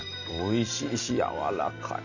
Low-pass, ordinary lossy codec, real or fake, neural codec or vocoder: 7.2 kHz; none; real; none